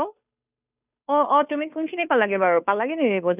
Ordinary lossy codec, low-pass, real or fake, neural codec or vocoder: none; 3.6 kHz; fake; codec, 16 kHz, 2 kbps, FunCodec, trained on Chinese and English, 25 frames a second